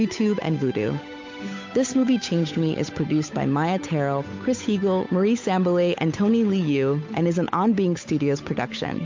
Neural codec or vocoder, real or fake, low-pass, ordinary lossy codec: codec, 16 kHz, 8 kbps, FunCodec, trained on Chinese and English, 25 frames a second; fake; 7.2 kHz; MP3, 48 kbps